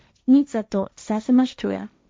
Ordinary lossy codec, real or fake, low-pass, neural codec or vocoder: none; fake; none; codec, 16 kHz, 1.1 kbps, Voila-Tokenizer